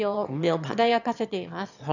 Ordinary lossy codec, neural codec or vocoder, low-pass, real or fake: none; autoencoder, 22.05 kHz, a latent of 192 numbers a frame, VITS, trained on one speaker; 7.2 kHz; fake